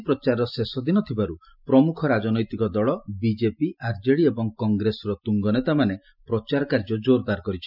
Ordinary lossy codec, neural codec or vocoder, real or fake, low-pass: none; none; real; 5.4 kHz